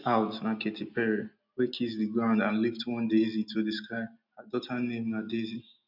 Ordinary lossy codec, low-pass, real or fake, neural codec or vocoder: none; 5.4 kHz; real; none